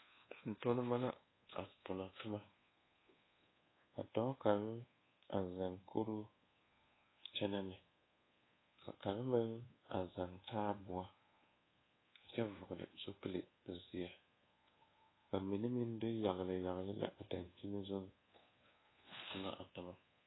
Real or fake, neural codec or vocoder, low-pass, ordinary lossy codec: fake; codec, 24 kHz, 1.2 kbps, DualCodec; 7.2 kHz; AAC, 16 kbps